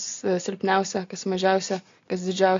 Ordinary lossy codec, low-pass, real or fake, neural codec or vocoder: AAC, 48 kbps; 7.2 kHz; real; none